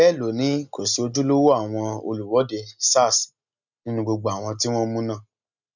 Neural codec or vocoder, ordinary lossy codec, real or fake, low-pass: none; none; real; 7.2 kHz